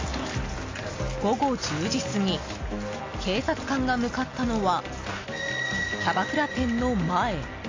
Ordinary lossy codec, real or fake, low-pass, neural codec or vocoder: AAC, 32 kbps; real; 7.2 kHz; none